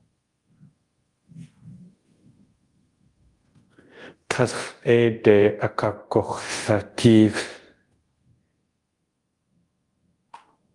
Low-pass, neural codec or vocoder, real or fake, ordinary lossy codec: 10.8 kHz; codec, 24 kHz, 0.5 kbps, DualCodec; fake; Opus, 24 kbps